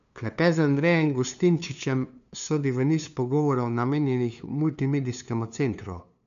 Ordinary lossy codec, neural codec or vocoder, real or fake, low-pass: none; codec, 16 kHz, 2 kbps, FunCodec, trained on LibriTTS, 25 frames a second; fake; 7.2 kHz